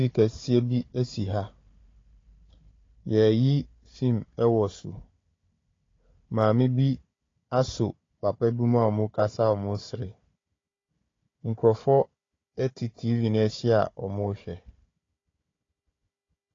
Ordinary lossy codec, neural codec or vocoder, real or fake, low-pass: AAC, 32 kbps; codec, 16 kHz, 4 kbps, FunCodec, trained on Chinese and English, 50 frames a second; fake; 7.2 kHz